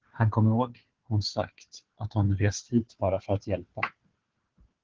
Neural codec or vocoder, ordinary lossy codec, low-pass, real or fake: codec, 16 kHz, 4 kbps, FunCodec, trained on Chinese and English, 50 frames a second; Opus, 16 kbps; 7.2 kHz; fake